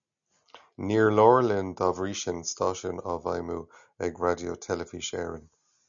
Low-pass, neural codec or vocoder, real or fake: 7.2 kHz; none; real